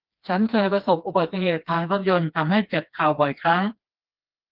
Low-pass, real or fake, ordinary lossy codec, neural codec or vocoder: 5.4 kHz; fake; Opus, 32 kbps; codec, 16 kHz, 2 kbps, FreqCodec, smaller model